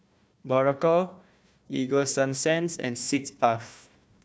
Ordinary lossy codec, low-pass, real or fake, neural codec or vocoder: none; none; fake; codec, 16 kHz, 1 kbps, FunCodec, trained on Chinese and English, 50 frames a second